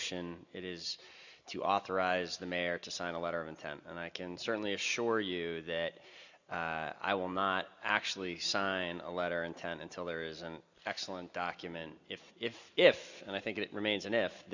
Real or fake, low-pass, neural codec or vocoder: real; 7.2 kHz; none